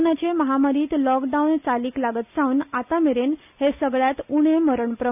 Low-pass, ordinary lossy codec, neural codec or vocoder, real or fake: 3.6 kHz; none; none; real